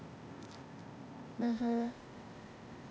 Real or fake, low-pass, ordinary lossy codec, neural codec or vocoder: fake; none; none; codec, 16 kHz, 0.8 kbps, ZipCodec